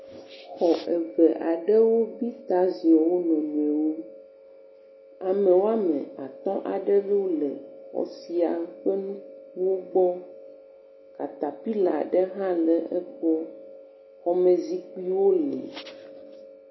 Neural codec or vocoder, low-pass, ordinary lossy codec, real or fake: none; 7.2 kHz; MP3, 24 kbps; real